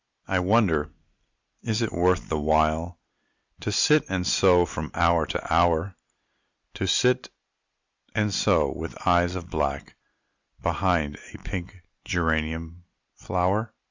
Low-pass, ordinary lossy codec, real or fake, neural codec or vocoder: 7.2 kHz; Opus, 64 kbps; real; none